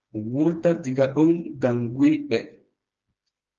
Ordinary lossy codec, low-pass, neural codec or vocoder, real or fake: Opus, 24 kbps; 7.2 kHz; codec, 16 kHz, 2 kbps, FreqCodec, smaller model; fake